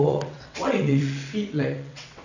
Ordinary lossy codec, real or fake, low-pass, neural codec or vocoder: none; fake; 7.2 kHz; vocoder, 22.05 kHz, 80 mel bands, WaveNeXt